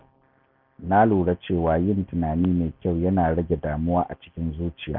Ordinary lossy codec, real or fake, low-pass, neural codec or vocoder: none; real; 5.4 kHz; none